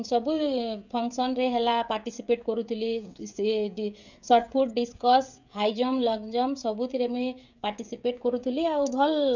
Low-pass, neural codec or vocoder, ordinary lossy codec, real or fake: 7.2 kHz; codec, 16 kHz, 16 kbps, FreqCodec, smaller model; none; fake